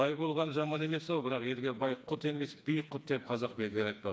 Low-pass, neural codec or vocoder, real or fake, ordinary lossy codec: none; codec, 16 kHz, 2 kbps, FreqCodec, smaller model; fake; none